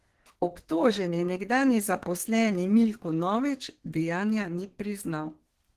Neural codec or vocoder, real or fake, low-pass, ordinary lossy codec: codec, 32 kHz, 1.9 kbps, SNAC; fake; 14.4 kHz; Opus, 16 kbps